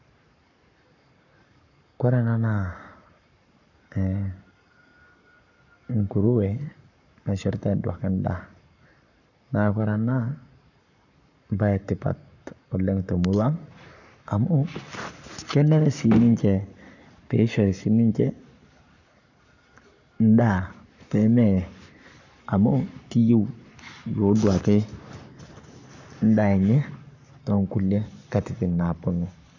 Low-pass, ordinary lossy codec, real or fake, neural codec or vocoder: 7.2 kHz; none; fake; codec, 44.1 kHz, 7.8 kbps, Pupu-Codec